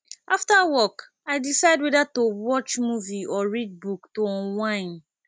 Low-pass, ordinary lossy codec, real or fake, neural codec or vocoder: none; none; real; none